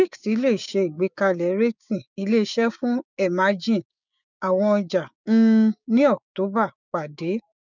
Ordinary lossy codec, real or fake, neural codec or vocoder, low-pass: none; fake; codec, 16 kHz, 6 kbps, DAC; 7.2 kHz